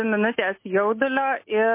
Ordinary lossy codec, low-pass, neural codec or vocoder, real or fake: MP3, 24 kbps; 3.6 kHz; none; real